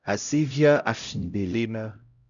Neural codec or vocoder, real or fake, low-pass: codec, 16 kHz, 0.5 kbps, X-Codec, HuBERT features, trained on LibriSpeech; fake; 7.2 kHz